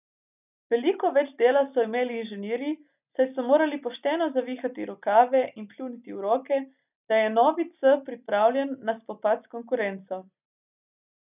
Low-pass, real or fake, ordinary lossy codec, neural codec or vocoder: 3.6 kHz; real; none; none